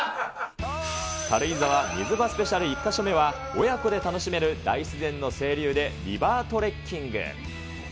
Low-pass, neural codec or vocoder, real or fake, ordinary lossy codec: none; none; real; none